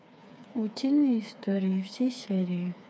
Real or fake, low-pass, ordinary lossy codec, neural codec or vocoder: fake; none; none; codec, 16 kHz, 4 kbps, FreqCodec, smaller model